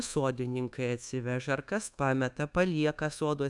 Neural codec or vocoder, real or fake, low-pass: codec, 24 kHz, 1.2 kbps, DualCodec; fake; 10.8 kHz